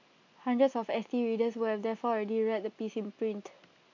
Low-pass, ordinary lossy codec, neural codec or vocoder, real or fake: 7.2 kHz; AAC, 48 kbps; none; real